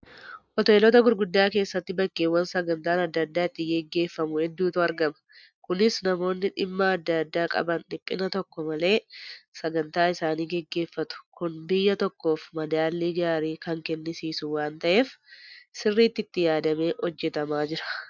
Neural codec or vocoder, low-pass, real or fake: none; 7.2 kHz; real